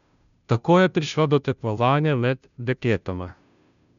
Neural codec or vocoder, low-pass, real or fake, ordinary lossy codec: codec, 16 kHz, 0.5 kbps, FunCodec, trained on Chinese and English, 25 frames a second; 7.2 kHz; fake; none